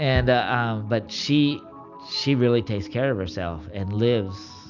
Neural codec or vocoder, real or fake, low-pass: none; real; 7.2 kHz